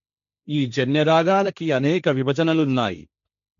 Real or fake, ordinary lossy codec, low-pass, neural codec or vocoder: fake; MP3, 64 kbps; 7.2 kHz; codec, 16 kHz, 1.1 kbps, Voila-Tokenizer